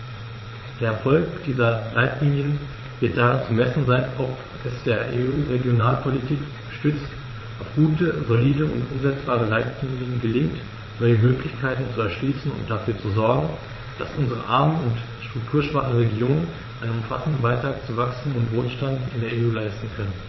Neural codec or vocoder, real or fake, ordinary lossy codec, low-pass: vocoder, 22.05 kHz, 80 mel bands, Vocos; fake; MP3, 24 kbps; 7.2 kHz